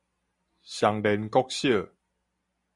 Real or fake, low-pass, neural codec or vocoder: real; 10.8 kHz; none